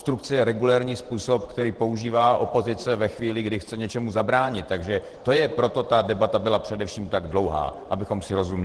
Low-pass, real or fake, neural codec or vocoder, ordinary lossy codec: 10.8 kHz; fake; vocoder, 48 kHz, 128 mel bands, Vocos; Opus, 16 kbps